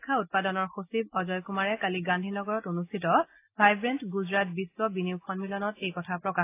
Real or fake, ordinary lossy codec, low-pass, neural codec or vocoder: real; AAC, 24 kbps; 3.6 kHz; none